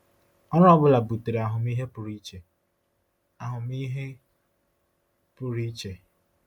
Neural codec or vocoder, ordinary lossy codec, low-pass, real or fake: none; none; 19.8 kHz; real